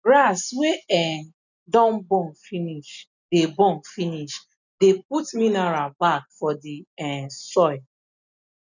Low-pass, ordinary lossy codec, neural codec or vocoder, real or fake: 7.2 kHz; none; none; real